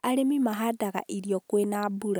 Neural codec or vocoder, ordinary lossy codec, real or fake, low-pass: none; none; real; none